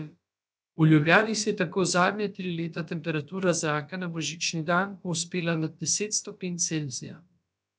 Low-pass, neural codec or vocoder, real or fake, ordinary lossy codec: none; codec, 16 kHz, about 1 kbps, DyCAST, with the encoder's durations; fake; none